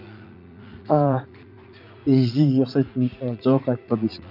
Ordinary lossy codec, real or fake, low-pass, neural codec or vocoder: none; fake; 5.4 kHz; vocoder, 44.1 kHz, 80 mel bands, Vocos